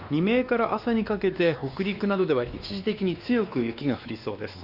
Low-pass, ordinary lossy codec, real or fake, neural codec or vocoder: 5.4 kHz; Opus, 64 kbps; fake; codec, 16 kHz, 2 kbps, X-Codec, WavLM features, trained on Multilingual LibriSpeech